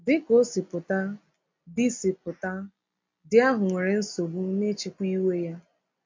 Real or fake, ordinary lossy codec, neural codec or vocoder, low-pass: real; MP3, 48 kbps; none; 7.2 kHz